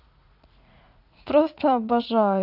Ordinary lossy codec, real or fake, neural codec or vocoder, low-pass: none; fake; vocoder, 44.1 kHz, 80 mel bands, Vocos; 5.4 kHz